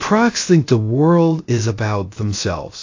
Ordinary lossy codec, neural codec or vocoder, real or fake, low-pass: AAC, 48 kbps; codec, 16 kHz, 0.3 kbps, FocalCodec; fake; 7.2 kHz